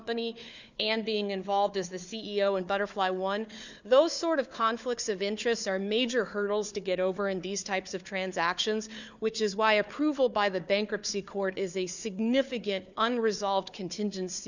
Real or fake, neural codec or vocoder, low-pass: fake; codec, 16 kHz, 4 kbps, FunCodec, trained on Chinese and English, 50 frames a second; 7.2 kHz